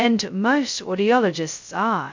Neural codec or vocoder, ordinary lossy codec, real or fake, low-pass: codec, 16 kHz, 0.2 kbps, FocalCodec; MP3, 64 kbps; fake; 7.2 kHz